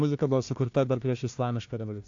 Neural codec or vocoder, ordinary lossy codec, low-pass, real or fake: codec, 16 kHz, 1 kbps, FunCodec, trained on Chinese and English, 50 frames a second; AAC, 48 kbps; 7.2 kHz; fake